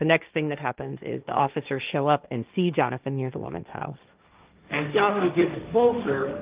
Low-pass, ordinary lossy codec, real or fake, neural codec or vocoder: 3.6 kHz; Opus, 24 kbps; fake; codec, 16 kHz, 1.1 kbps, Voila-Tokenizer